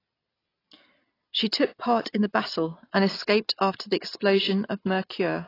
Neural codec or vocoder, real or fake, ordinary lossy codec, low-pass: none; real; AAC, 24 kbps; 5.4 kHz